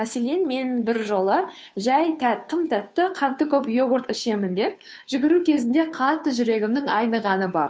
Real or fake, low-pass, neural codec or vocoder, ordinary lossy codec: fake; none; codec, 16 kHz, 2 kbps, FunCodec, trained on Chinese and English, 25 frames a second; none